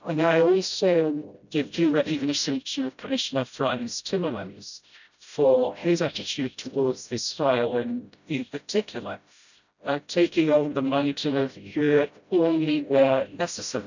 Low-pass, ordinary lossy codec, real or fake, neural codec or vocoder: 7.2 kHz; none; fake; codec, 16 kHz, 0.5 kbps, FreqCodec, smaller model